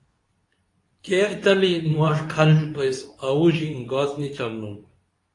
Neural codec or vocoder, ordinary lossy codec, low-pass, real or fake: codec, 24 kHz, 0.9 kbps, WavTokenizer, medium speech release version 2; AAC, 48 kbps; 10.8 kHz; fake